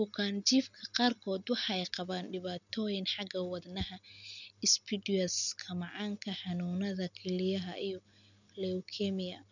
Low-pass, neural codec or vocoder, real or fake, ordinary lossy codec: 7.2 kHz; none; real; none